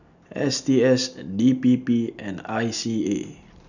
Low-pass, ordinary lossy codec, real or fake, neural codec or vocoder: 7.2 kHz; none; real; none